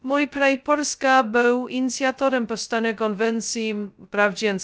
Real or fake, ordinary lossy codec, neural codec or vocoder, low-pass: fake; none; codec, 16 kHz, 0.2 kbps, FocalCodec; none